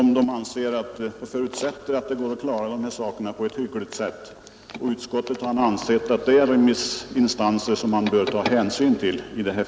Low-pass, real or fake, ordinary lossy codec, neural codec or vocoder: none; real; none; none